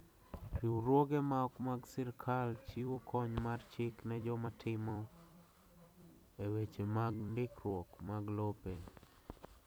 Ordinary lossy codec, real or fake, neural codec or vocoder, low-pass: none; fake; vocoder, 44.1 kHz, 128 mel bands every 256 samples, BigVGAN v2; none